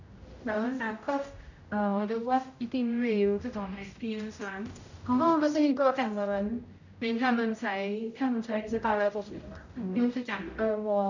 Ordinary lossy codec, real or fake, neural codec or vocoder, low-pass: none; fake; codec, 16 kHz, 0.5 kbps, X-Codec, HuBERT features, trained on general audio; 7.2 kHz